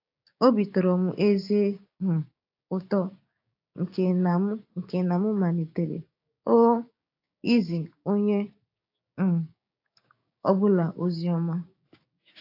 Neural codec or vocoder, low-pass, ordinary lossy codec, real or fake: codec, 16 kHz, 6 kbps, DAC; 5.4 kHz; AAC, 32 kbps; fake